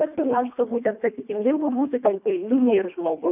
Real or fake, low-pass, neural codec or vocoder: fake; 3.6 kHz; codec, 24 kHz, 1.5 kbps, HILCodec